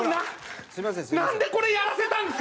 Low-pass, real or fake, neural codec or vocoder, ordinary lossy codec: none; real; none; none